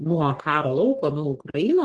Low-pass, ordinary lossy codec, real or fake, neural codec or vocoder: 10.8 kHz; Opus, 16 kbps; fake; codec, 44.1 kHz, 3.4 kbps, Pupu-Codec